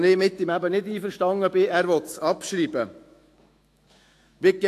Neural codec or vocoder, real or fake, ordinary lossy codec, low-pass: autoencoder, 48 kHz, 128 numbers a frame, DAC-VAE, trained on Japanese speech; fake; AAC, 64 kbps; 14.4 kHz